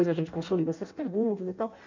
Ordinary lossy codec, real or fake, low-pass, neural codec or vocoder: none; fake; 7.2 kHz; codec, 16 kHz in and 24 kHz out, 0.6 kbps, FireRedTTS-2 codec